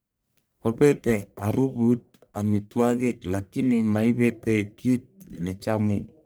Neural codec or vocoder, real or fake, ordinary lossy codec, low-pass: codec, 44.1 kHz, 1.7 kbps, Pupu-Codec; fake; none; none